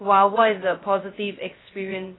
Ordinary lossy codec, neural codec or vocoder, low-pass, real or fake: AAC, 16 kbps; codec, 16 kHz, 0.2 kbps, FocalCodec; 7.2 kHz; fake